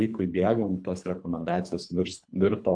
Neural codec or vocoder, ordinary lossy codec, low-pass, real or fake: codec, 24 kHz, 3 kbps, HILCodec; AAC, 64 kbps; 9.9 kHz; fake